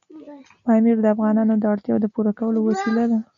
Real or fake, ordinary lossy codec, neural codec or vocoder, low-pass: real; AAC, 64 kbps; none; 7.2 kHz